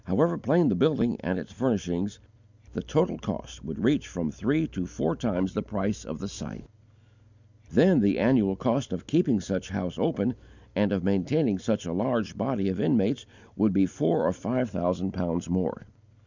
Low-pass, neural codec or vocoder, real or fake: 7.2 kHz; none; real